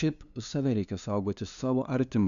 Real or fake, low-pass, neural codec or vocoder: fake; 7.2 kHz; codec, 16 kHz, 2 kbps, FunCodec, trained on LibriTTS, 25 frames a second